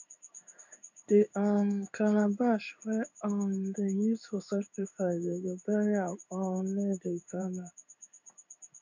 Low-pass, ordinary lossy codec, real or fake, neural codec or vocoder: 7.2 kHz; none; fake; codec, 16 kHz in and 24 kHz out, 1 kbps, XY-Tokenizer